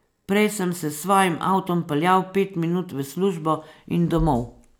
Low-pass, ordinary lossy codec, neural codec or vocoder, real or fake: none; none; none; real